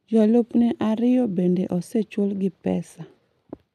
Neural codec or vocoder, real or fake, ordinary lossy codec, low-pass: none; real; none; 14.4 kHz